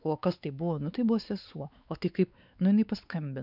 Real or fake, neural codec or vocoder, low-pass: fake; codec, 16 kHz, 4 kbps, X-Codec, WavLM features, trained on Multilingual LibriSpeech; 5.4 kHz